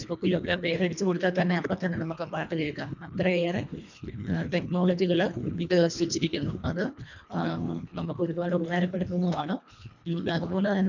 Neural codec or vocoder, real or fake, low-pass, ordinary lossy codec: codec, 24 kHz, 1.5 kbps, HILCodec; fake; 7.2 kHz; none